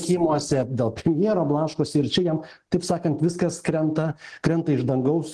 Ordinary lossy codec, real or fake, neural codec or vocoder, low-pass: Opus, 16 kbps; real; none; 10.8 kHz